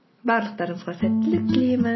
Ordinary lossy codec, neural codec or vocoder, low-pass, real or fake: MP3, 24 kbps; none; 7.2 kHz; real